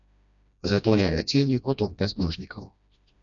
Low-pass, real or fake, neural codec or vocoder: 7.2 kHz; fake; codec, 16 kHz, 1 kbps, FreqCodec, smaller model